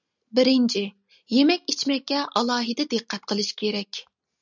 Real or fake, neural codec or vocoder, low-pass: real; none; 7.2 kHz